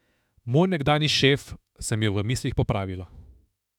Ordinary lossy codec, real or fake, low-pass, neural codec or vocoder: none; fake; 19.8 kHz; autoencoder, 48 kHz, 32 numbers a frame, DAC-VAE, trained on Japanese speech